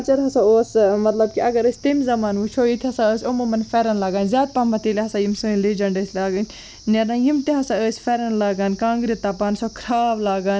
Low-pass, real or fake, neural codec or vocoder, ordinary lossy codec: none; real; none; none